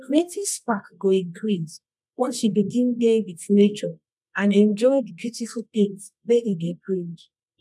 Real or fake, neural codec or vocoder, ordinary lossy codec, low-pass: fake; codec, 24 kHz, 0.9 kbps, WavTokenizer, medium music audio release; none; none